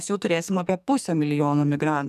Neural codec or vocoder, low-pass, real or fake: codec, 44.1 kHz, 2.6 kbps, SNAC; 14.4 kHz; fake